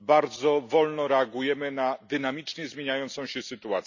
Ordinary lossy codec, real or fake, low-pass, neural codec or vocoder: none; real; 7.2 kHz; none